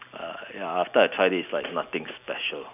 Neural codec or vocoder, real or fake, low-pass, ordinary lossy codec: none; real; 3.6 kHz; none